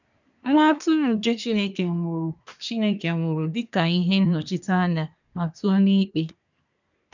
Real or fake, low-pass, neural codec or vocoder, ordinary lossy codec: fake; 7.2 kHz; codec, 24 kHz, 1 kbps, SNAC; none